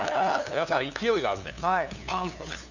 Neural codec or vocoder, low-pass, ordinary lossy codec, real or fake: codec, 16 kHz, 2 kbps, FunCodec, trained on LibriTTS, 25 frames a second; 7.2 kHz; none; fake